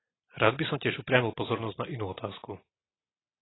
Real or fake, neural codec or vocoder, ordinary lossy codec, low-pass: real; none; AAC, 16 kbps; 7.2 kHz